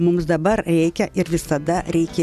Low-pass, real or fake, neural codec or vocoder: 14.4 kHz; real; none